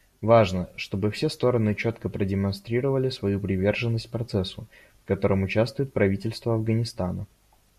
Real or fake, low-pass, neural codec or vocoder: real; 14.4 kHz; none